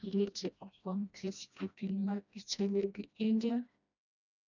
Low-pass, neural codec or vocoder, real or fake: 7.2 kHz; codec, 16 kHz, 1 kbps, FreqCodec, smaller model; fake